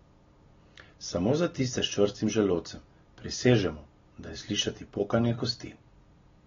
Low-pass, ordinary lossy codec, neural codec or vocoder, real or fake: 7.2 kHz; AAC, 24 kbps; none; real